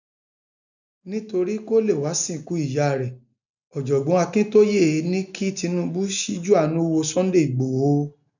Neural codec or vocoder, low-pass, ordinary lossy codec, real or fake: none; 7.2 kHz; none; real